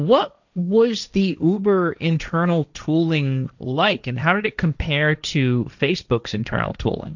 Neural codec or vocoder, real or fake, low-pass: codec, 16 kHz, 1.1 kbps, Voila-Tokenizer; fake; 7.2 kHz